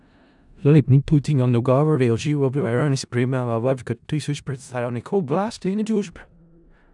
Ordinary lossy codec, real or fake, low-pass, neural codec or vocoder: none; fake; 10.8 kHz; codec, 16 kHz in and 24 kHz out, 0.4 kbps, LongCat-Audio-Codec, four codebook decoder